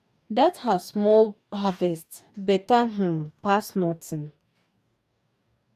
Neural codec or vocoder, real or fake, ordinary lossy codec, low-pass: codec, 44.1 kHz, 2.6 kbps, DAC; fake; none; 14.4 kHz